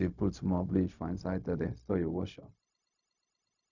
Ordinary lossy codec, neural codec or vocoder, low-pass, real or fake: none; codec, 16 kHz, 0.4 kbps, LongCat-Audio-Codec; 7.2 kHz; fake